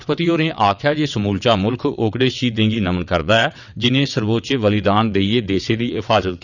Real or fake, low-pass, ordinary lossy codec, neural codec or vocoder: fake; 7.2 kHz; none; vocoder, 22.05 kHz, 80 mel bands, WaveNeXt